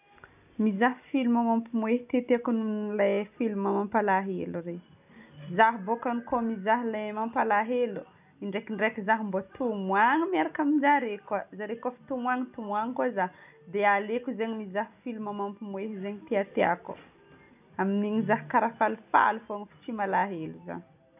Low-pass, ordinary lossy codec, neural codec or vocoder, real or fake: 3.6 kHz; none; none; real